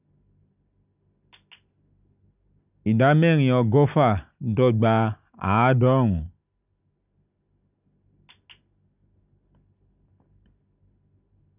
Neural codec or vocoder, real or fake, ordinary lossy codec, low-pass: none; real; none; 3.6 kHz